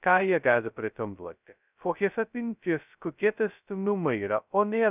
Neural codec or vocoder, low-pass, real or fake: codec, 16 kHz, 0.2 kbps, FocalCodec; 3.6 kHz; fake